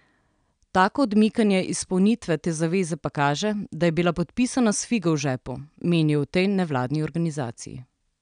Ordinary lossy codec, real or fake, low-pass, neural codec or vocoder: none; real; 9.9 kHz; none